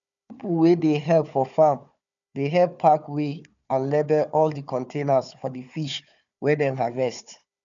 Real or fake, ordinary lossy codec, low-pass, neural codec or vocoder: fake; none; 7.2 kHz; codec, 16 kHz, 4 kbps, FunCodec, trained on Chinese and English, 50 frames a second